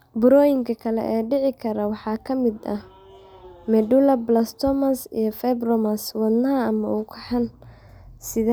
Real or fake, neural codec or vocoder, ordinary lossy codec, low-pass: real; none; none; none